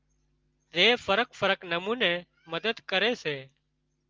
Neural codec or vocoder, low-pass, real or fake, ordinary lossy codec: none; 7.2 kHz; real; Opus, 32 kbps